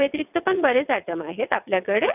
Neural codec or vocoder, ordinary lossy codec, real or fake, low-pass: vocoder, 22.05 kHz, 80 mel bands, WaveNeXt; none; fake; 3.6 kHz